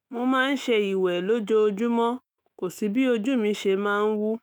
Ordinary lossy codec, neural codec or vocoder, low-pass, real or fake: none; autoencoder, 48 kHz, 128 numbers a frame, DAC-VAE, trained on Japanese speech; none; fake